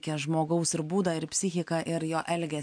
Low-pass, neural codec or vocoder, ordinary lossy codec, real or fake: 9.9 kHz; none; MP3, 64 kbps; real